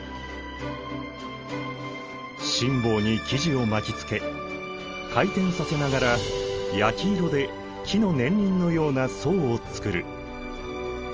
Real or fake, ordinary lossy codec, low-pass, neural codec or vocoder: real; Opus, 24 kbps; 7.2 kHz; none